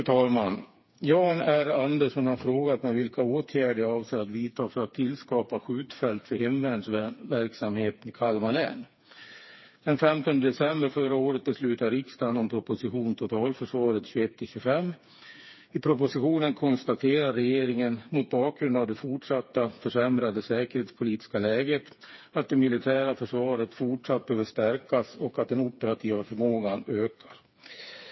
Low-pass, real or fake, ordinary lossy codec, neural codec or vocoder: 7.2 kHz; fake; MP3, 24 kbps; codec, 16 kHz, 4 kbps, FreqCodec, smaller model